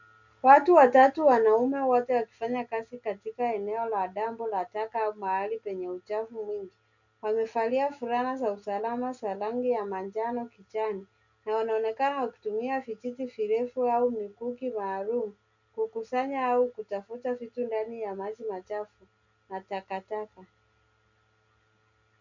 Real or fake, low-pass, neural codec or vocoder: real; 7.2 kHz; none